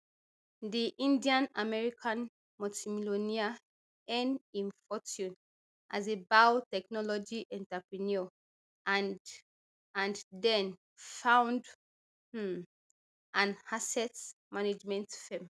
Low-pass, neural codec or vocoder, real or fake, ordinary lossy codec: none; none; real; none